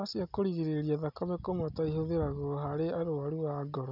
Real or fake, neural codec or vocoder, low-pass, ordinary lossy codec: real; none; 5.4 kHz; none